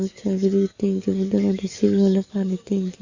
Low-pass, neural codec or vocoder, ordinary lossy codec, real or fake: 7.2 kHz; codec, 44.1 kHz, 7.8 kbps, DAC; Opus, 64 kbps; fake